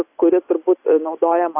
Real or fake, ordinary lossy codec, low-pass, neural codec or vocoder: real; AAC, 24 kbps; 3.6 kHz; none